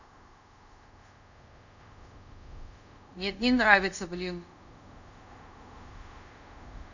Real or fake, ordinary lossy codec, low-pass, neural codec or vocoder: fake; none; 7.2 kHz; codec, 24 kHz, 0.5 kbps, DualCodec